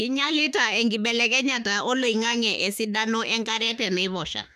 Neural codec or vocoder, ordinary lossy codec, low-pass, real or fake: autoencoder, 48 kHz, 32 numbers a frame, DAC-VAE, trained on Japanese speech; none; 14.4 kHz; fake